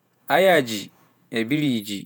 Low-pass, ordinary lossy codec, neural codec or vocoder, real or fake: none; none; vocoder, 48 kHz, 128 mel bands, Vocos; fake